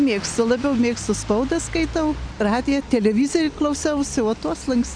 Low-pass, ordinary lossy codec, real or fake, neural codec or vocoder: 9.9 kHz; MP3, 96 kbps; real; none